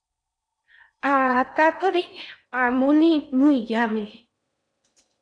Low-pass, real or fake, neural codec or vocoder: 9.9 kHz; fake; codec, 16 kHz in and 24 kHz out, 0.6 kbps, FocalCodec, streaming, 4096 codes